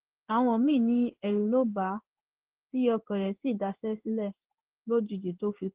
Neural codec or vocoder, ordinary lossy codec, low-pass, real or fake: codec, 16 kHz in and 24 kHz out, 1 kbps, XY-Tokenizer; Opus, 16 kbps; 3.6 kHz; fake